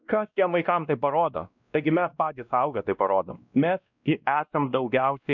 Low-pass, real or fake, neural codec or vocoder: 7.2 kHz; fake; codec, 16 kHz, 1 kbps, X-Codec, WavLM features, trained on Multilingual LibriSpeech